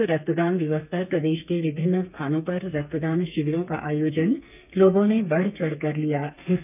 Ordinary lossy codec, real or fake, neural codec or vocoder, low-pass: none; fake; codec, 32 kHz, 1.9 kbps, SNAC; 3.6 kHz